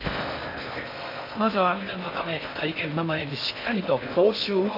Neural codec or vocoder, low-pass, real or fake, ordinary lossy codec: codec, 16 kHz in and 24 kHz out, 0.6 kbps, FocalCodec, streaming, 4096 codes; 5.4 kHz; fake; AAC, 32 kbps